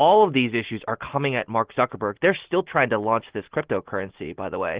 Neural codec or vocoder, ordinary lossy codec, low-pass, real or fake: none; Opus, 16 kbps; 3.6 kHz; real